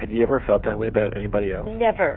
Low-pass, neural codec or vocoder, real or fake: 5.4 kHz; codec, 16 kHz, 8 kbps, FreqCodec, smaller model; fake